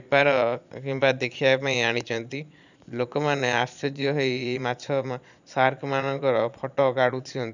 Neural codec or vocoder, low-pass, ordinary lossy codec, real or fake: vocoder, 22.05 kHz, 80 mel bands, Vocos; 7.2 kHz; none; fake